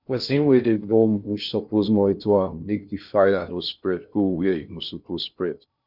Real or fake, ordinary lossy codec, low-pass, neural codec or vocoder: fake; none; 5.4 kHz; codec, 16 kHz in and 24 kHz out, 0.6 kbps, FocalCodec, streaming, 2048 codes